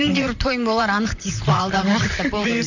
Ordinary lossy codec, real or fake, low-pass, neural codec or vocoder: none; fake; 7.2 kHz; vocoder, 44.1 kHz, 128 mel bands, Pupu-Vocoder